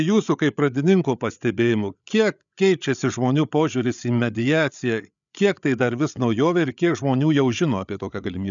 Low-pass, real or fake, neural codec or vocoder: 7.2 kHz; fake; codec, 16 kHz, 8 kbps, FreqCodec, larger model